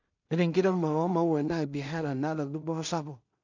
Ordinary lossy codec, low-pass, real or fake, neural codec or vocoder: none; 7.2 kHz; fake; codec, 16 kHz in and 24 kHz out, 0.4 kbps, LongCat-Audio-Codec, two codebook decoder